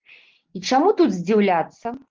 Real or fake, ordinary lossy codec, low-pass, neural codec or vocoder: real; Opus, 32 kbps; 7.2 kHz; none